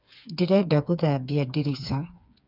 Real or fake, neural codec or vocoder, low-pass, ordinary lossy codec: fake; codec, 16 kHz, 4 kbps, FreqCodec, smaller model; 5.4 kHz; none